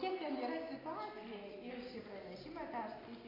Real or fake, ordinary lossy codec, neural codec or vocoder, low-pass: real; AAC, 24 kbps; none; 5.4 kHz